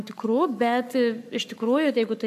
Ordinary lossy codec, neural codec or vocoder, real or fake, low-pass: AAC, 96 kbps; codec, 44.1 kHz, 7.8 kbps, Pupu-Codec; fake; 14.4 kHz